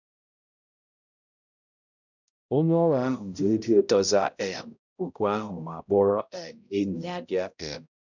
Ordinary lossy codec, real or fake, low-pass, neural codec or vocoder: none; fake; 7.2 kHz; codec, 16 kHz, 0.5 kbps, X-Codec, HuBERT features, trained on balanced general audio